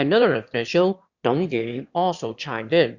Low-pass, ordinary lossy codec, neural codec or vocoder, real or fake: 7.2 kHz; Opus, 64 kbps; autoencoder, 22.05 kHz, a latent of 192 numbers a frame, VITS, trained on one speaker; fake